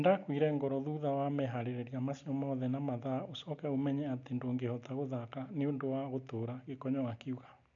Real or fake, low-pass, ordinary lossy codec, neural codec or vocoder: real; 7.2 kHz; none; none